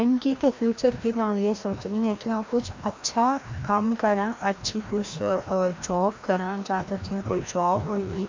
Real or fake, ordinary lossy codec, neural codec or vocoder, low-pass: fake; MP3, 48 kbps; codec, 16 kHz, 1 kbps, FreqCodec, larger model; 7.2 kHz